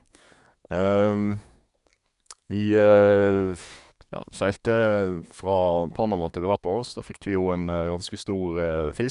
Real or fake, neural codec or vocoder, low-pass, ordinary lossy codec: fake; codec, 24 kHz, 1 kbps, SNAC; 10.8 kHz; none